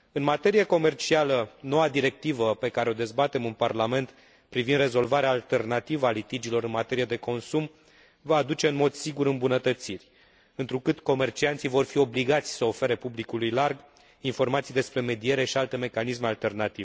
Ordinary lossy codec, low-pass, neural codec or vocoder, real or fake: none; none; none; real